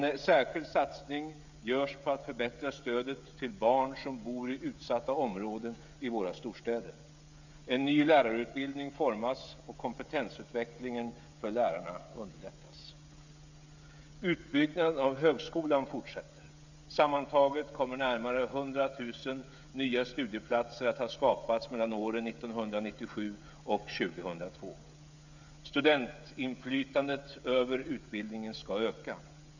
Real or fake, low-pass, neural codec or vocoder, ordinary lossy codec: fake; 7.2 kHz; codec, 16 kHz, 16 kbps, FreqCodec, smaller model; none